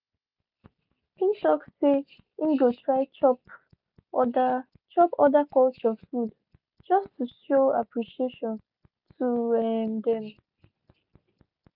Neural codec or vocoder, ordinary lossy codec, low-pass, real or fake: none; none; 5.4 kHz; real